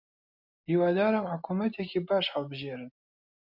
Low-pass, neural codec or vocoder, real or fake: 5.4 kHz; none; real